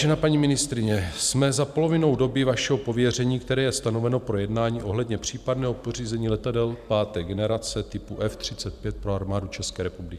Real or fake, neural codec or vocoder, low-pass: fake; vocoder, 44.1 kHz, 128 mel bands every 512 samples, BigVGAN v2; 14.4 kHz